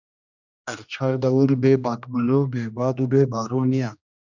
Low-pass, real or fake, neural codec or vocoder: 7.2 kHz; fake; codec, 16 kHz, 1 kbps, X-Codec, HuBERT features, trained on general audio